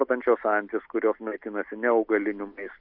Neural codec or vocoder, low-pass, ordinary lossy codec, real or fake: none; 5.4 kHz; AAC, 48 kbps; real